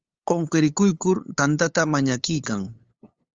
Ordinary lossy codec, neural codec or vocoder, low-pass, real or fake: Opus, 32 kbps; codec, 16 kHz, 8 kbps, FunCodec, trained on LibriTTS, 25 frames a second; 7.2 kHz; fake